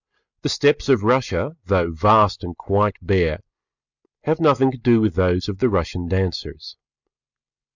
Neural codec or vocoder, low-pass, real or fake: none; 7.2 kHz; real